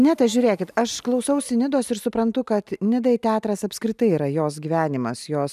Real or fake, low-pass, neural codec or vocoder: real; 14.4 kHz; none